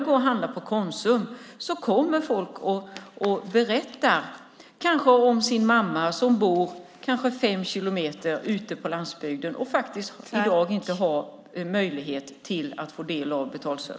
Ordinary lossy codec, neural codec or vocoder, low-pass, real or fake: none; none; none; real